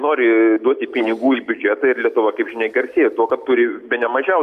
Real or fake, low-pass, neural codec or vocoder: real; 14.4 kHz; none